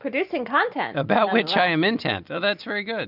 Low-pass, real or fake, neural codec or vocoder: 5.4 kHz; real; none